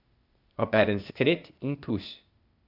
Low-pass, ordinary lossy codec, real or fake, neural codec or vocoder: 5.4 kHz; none; fake; codec, 16 kHz, 0.8 kbps, ZipCodec